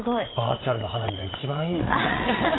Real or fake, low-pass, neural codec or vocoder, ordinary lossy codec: fake; 7.2 kHz; vocoder, 22.05 kHz, 80 mel bands, WaveNeXt; AAC, 16 kbps